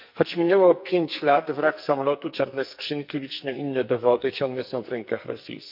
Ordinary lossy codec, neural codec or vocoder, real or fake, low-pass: none; codec, 44.1 kHz, 2.6 kbps, SNAC; fake; 5.4 kHz